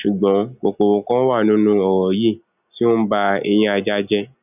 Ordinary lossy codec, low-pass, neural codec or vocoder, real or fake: none; 3.6 kHz; none; real